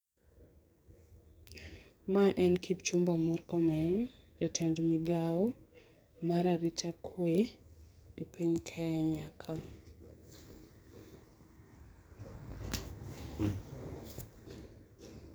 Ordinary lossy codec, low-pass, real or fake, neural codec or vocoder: none; none; fake; codec, 44.1 kHz, 2.6 kbps, SNAC